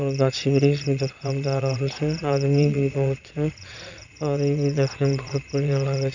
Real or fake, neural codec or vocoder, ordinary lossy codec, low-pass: fake; vocoder, 22.05 kHz, 80 mel bands, Vocos; none; 7.2 kHz